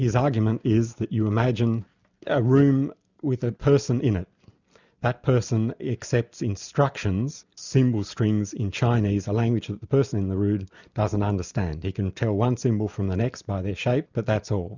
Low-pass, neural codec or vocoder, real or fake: 7.2 kHz; none; real